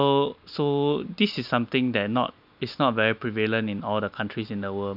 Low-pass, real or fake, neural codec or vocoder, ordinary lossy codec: 5.4 kHz; real; none; none